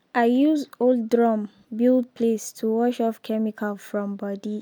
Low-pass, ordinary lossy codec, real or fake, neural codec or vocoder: none; none; real; none